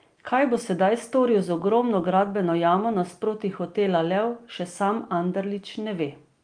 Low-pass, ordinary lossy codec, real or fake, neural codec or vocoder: 9.9 kHz; Opus, 32 kbps; real; none